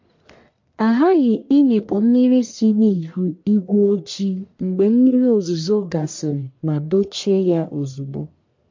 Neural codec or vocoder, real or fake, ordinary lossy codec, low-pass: codec, 44.1 kHz, 1.7 kbps, Pupu-Codec; fake; MP3, 48 kbps; 7.2 kHz